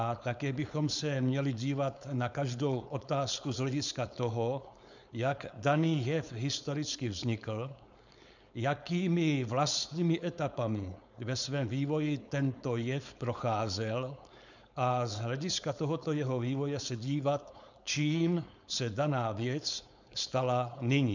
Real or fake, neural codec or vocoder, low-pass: fake; codec, 16 kHz, 4.8 kbps, FACodec; 7.2 kHz